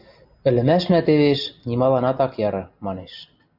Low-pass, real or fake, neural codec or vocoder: 5.4 kHz; real; none